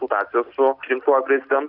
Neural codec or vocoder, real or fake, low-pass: none; real; 5.4 kHz